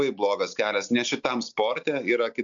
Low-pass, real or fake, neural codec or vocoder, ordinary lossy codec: 7.2 kHz; real; none; MP3, 64 kbps